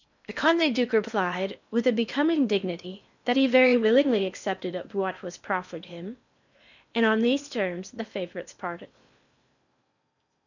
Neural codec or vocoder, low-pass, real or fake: codec, 16 kHz in and 24 kHz out, 0.8 kbps, FocalCodec, streaming, 65536 codes; 7.2 kHz; fake